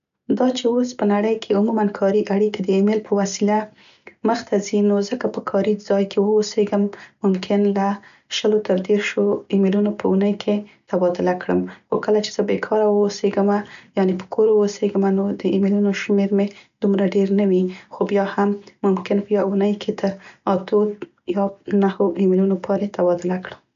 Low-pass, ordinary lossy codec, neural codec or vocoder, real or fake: 7.2 kHz; none; none; real